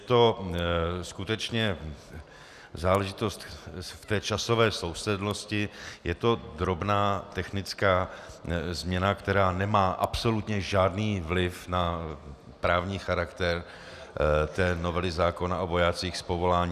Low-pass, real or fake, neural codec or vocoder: 14.4 kHz; real; none